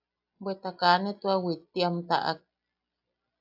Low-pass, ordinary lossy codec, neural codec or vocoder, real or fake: 5.4 kHz; AAC, 48 kbps; none; real